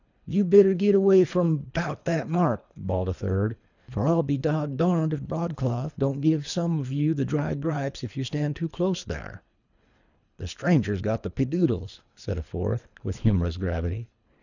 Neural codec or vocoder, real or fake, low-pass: codec, 24 kHz, 3 kbps, HILCodec; fake; 7.2 kHz